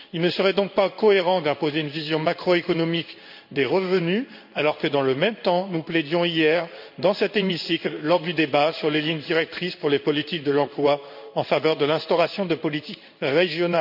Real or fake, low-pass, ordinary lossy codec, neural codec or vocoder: fake; 5.4 kHz; none; codec, 16 kHz in and 24 kHz out, 1 kbps, XY-Tokenizer